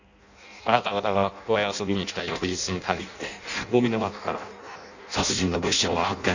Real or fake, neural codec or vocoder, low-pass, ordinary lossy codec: fake; codec, 16 kHz in and 24 kHz out, 0.6 kbps, FireRedTTS-2 codec; 7.2 kHz; none